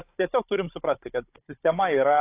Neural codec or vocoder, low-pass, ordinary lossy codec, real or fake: codec, 16 kHz, 16 kbps, FunCodec, trained on Chinese and English, 50 frames a second; 3.6 kHz; AAC, 24 kbps; fake